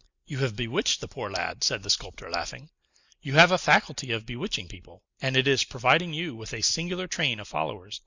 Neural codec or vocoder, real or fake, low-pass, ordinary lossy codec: none; real; 7.2 kHz; Opus, 64 kbps